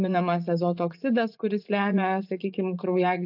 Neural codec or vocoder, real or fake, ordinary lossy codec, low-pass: vocoder, 44.1 kHz, 80 mel bands, Vocos; fake; AAC, 48 kbps; 5.4 kHz